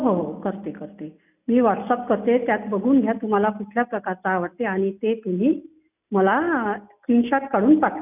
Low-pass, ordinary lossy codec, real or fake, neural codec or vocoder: 3.6 kHz; none; real; none